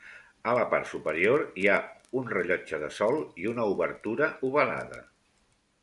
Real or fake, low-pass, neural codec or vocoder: real; 10.8 kHz; none